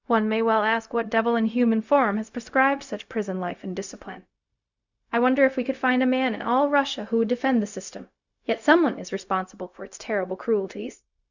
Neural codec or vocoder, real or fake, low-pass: codec, 16 kHz, 0.4 kbps, LongCat-Audio-Codec; fake; 7.2 kHz